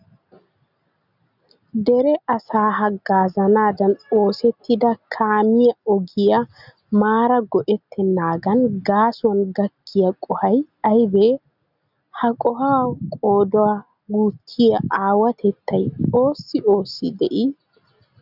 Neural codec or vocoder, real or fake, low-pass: none; real; 5.4 kHz